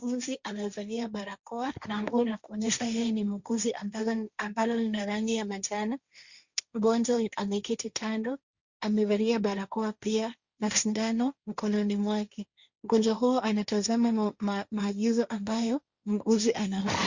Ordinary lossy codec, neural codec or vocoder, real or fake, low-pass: Opus, 64 kbps; codec, 16 kHz, 1.1 kbps, Voila-Tokenizer; fake; 7.2 kHz